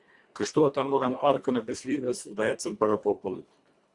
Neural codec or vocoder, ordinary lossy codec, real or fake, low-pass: codec, 24 kHz, 1.5 kbps, HILCodec; Opus, 64 kbps; fake; 10.8 kHz